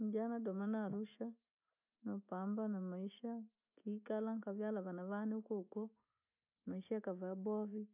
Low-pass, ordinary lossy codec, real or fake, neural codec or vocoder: 3.6 kHz; none; real; none